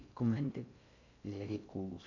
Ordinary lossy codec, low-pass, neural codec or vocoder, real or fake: AAC, 48 kbps; 7.2 kHz; codec, 16 kHz in and 24 kHz out, 0.6 kbps, FocalCodec, streaming, 4096 codes; fake